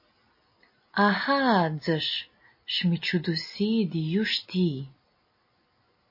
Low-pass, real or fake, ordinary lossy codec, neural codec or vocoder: 5.4 kHz; real; MP3, 24 kbps; none